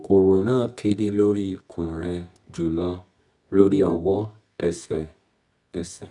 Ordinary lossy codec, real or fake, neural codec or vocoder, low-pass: none; fake; codec, 24 kHz, 0.9 kbps, WavTokenizer, medium music audio release; 10.8 kHz